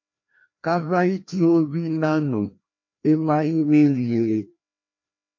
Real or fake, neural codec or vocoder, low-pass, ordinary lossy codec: fake; codec, 16 kHz, 1 kbps, FreqCodec, larger model; 7.2 kHz; MP3, 48 kbps